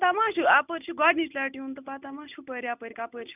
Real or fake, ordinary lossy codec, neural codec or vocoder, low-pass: real; none; none; 3.6 kHz